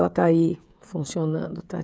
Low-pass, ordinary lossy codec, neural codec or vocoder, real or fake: none; none; codec, 16 kHz, 16 kbps, FreqCodec, smaller model; fake